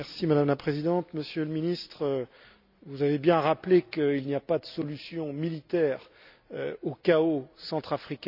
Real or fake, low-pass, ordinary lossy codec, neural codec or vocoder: real; 5.4 kHz; none; none